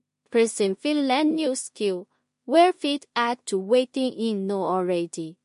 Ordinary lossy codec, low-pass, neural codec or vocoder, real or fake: MP3, 48 kbps; 10.8 kHz; codec, 16 kHz in and 24 kHz out, 0.4 kbps, LongCat-Audio-Codec, two codebook decoder; fake